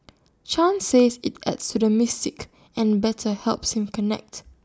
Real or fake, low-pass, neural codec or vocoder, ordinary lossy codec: real; none; none; none